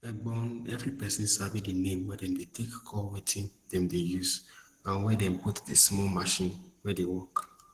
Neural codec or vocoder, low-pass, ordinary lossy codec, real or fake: codec, 44.1 kHz, 7.8 kbps, Pupu-Codec; 14.4 kHz; Opus, 16 kbps; fake